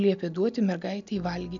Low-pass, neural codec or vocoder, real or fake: 7.2 kHz; none; real